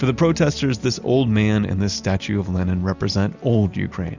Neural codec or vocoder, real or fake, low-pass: none; real; 7.2 kHz